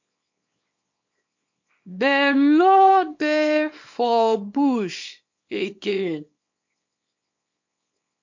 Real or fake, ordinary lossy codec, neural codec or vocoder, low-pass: fake; MP3, 48 kbps; codec, 24 kHz, 0.9 kbps, WavTokenizer, small release; 7.2 kHz